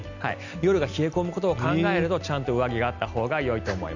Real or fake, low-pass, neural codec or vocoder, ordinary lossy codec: real; 7.2 kHz; none; none